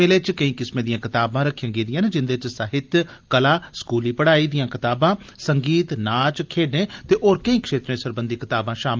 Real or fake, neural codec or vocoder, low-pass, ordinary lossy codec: real; none; 7.2 kHz; Opus, 24 kbps